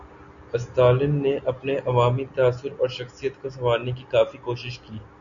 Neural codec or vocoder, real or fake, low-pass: none; real; 7.2 kHz